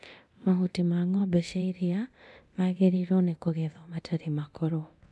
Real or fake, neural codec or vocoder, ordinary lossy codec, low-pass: fake; codec, 24 kHz, 0.9 kbps, DualCodec; none; none